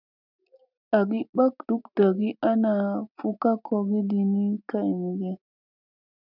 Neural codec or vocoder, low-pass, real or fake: none; 5.4 kHz; real